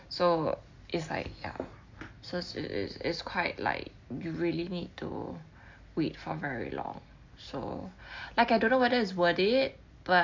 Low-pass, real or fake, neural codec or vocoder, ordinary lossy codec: 7.2 kHz; real; none; MP3, 48 kbps